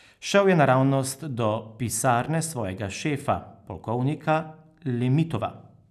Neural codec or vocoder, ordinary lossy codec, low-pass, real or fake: none; none; 14.4 kHz; real